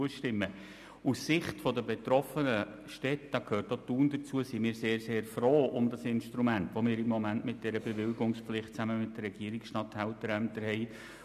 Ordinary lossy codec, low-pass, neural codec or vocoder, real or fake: none; 14.4 kHz; none; real